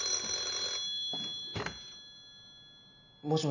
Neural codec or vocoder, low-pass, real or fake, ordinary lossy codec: none; 7.2 kHz; real; none